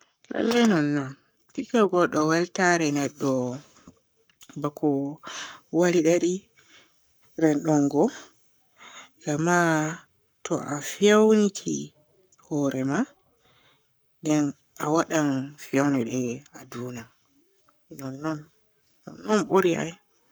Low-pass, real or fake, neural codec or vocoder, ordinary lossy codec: none; fake; codec, 44.1 kHz, 7.8 kbps, Pupu-Codec; none